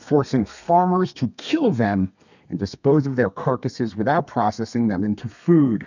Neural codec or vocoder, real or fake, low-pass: codec, 32 kHz, 1.9 kbps, SNAC; fake; 7.2 kHz